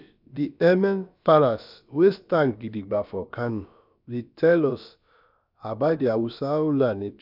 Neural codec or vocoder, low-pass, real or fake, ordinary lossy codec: codec, 16 kHz, about 1 kbps, DyCAST, with the encoder's durations; 5.4 kHz; fake; none